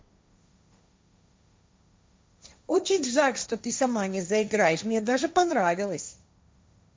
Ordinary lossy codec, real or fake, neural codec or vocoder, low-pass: none; fake; codec, 16 kHz, 1.1 kbps, Voila-Tokenizer; none